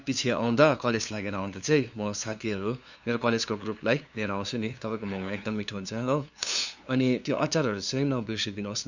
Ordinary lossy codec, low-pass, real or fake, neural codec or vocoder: none; 7.2 kHz; fake; codec, 16 kHz, 2 kbps, FunCodec, trained on LibriTTS, 25 frames a second